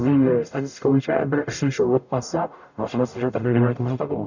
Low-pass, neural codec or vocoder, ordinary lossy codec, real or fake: 7.2 kHz; codec, 44.1 kHz, 0.9 kbps, DAC; none; fake